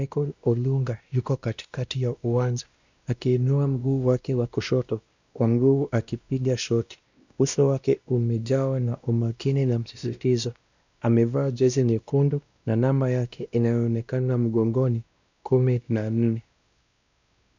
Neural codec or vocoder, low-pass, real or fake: codec, 16 kHz, 1 kbps, X-Codec, WavLM features, trained on Multilingual LibriSpeech; 7.2 kHz; fake